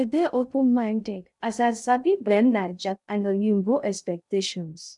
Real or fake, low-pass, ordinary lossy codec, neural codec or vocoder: fake; 10.8 kHz; none; codec, 16 kHz in and 24 kHz out, 0.6 kbps, FocalCodec, streaming, 2048 codes